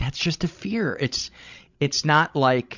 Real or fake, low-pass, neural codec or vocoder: fake; 7.2 kHz; codec, 16 kHz, 16 kbps, FreqCodec, larger model